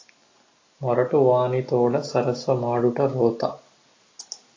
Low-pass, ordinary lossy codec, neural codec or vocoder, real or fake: 7.2 kHz; AAC, 32 kbps; none; real